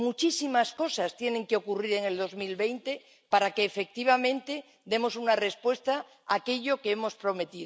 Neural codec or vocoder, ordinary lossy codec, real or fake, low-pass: none; none; real; none